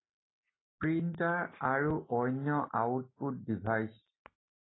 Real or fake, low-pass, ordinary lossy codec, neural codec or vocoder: real; 7.2 kHz; AAC, 16 kbps; none